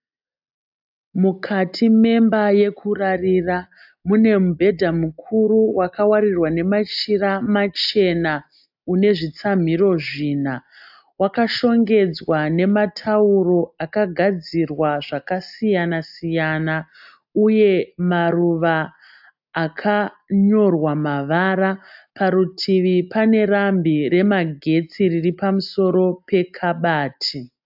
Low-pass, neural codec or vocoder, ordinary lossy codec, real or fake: 5.4 kHz; none; AAC, 48 kbps; real